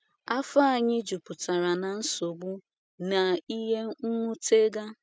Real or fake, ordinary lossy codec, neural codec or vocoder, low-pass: real; none; none; none